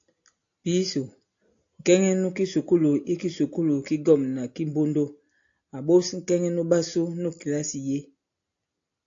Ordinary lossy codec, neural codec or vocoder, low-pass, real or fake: AAC, 48 kbps; none; 7.2 kHz; real